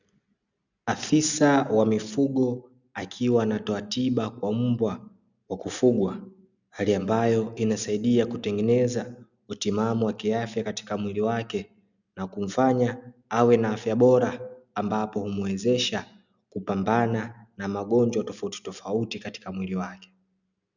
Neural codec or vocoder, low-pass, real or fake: none; 7.2 kHz; real